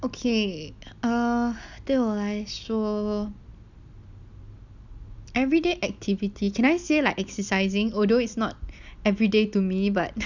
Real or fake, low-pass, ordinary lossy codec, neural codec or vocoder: real; 7.2 kHz; none; none